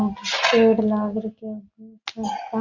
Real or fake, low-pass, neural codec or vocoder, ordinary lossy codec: real; 7.2 kHz; none; Opus, 64 kbps